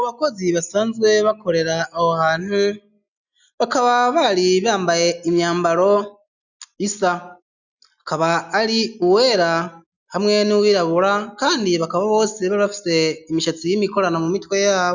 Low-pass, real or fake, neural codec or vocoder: 7.2 kHz; real; none